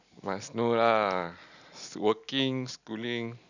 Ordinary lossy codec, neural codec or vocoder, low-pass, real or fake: none; none; 7.2 kHz; real